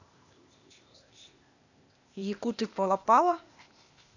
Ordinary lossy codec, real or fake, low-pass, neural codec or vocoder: none; fake; 7.2 kHz; codec, 16 kHz, 0.8 kbps, ZipCodec